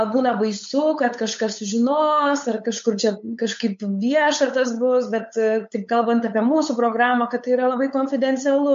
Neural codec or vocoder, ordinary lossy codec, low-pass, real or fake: codec, 16 kHz, 8 kbps, FunCodec, trained on LibriTTS, 25 frames a second; MP3, 48 kbps; 7.2 kHz; fake